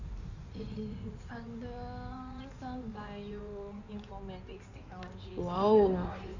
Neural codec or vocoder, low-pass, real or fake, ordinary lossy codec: codec, 16 kHz in and 24 kHz out, 2.2 kbps, FireRedTTS-2 codec; 7.2 kHz; fake; none